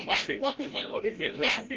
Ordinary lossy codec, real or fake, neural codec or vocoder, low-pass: Opus, 16 kbps; fake; codec, 16 kHz, 0.5 kbps, FreqCodec, larger model; 7.2 kHz